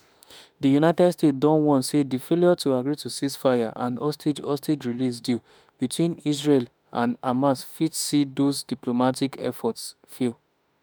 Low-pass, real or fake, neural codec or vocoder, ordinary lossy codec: none; fake; autoencoder, 48 kHz, 32 numbers a frame, DAC-VAE, trained on Japanese speech; none